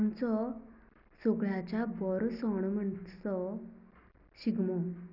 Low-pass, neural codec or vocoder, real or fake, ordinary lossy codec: 5.4 kHz; none; real; none